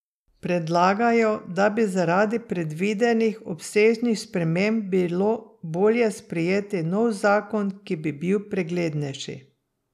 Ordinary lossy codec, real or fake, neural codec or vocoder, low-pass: none; real; none; 14.4 kHz